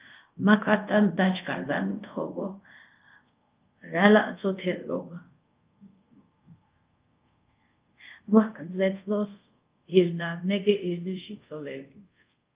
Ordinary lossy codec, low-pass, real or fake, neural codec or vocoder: Opus, 32 kbps; 3.6 kHz; fake; codec, 24 kHz, 0.5 kbps, DualCodec